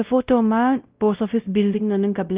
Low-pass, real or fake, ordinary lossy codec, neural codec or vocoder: 3.6 kHz; fake; Opus, 24 kbps; codec, 16 kHz, 0.5 kbps, X-Codec, WavLM features, trained on Multilingual LibriSpeech